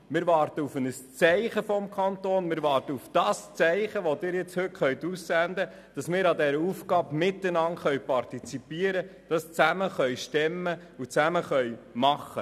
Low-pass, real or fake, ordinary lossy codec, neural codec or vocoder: 14.4 kHz; real; none; none